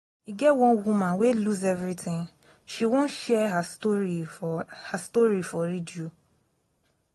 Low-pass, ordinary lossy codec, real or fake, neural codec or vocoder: 19.8 kHz; AAC, 32 kbps; real; none